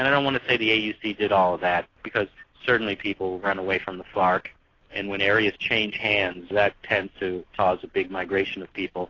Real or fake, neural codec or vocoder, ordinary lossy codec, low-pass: real; none; AAC, 32 kbps; 7.2 kHz